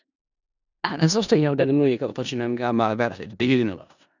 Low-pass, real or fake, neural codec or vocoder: 7.2 kHz; fake; codec, 16 kHz in and 24 kHz out, 0.4 kbps, LongCat-Audio-Codec, four codebook decoder